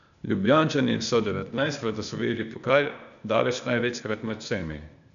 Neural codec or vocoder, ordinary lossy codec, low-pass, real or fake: codec, 16 kHz, 0.8 kbps, ZipCodec; MP3, 96 kbps; 7.2 kHz; fake